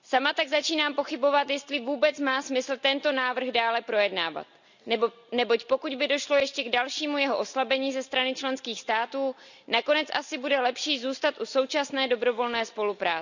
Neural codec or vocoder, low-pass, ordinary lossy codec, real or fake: none; 7.2 kHz; none; real